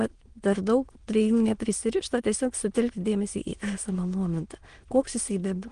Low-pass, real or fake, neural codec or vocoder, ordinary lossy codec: 9.9 kHz; fake; autoencoder, 22.05 kHz, a latent of 192 numbers a frame, VITS, trained on many speakers; Opus, 24 kbps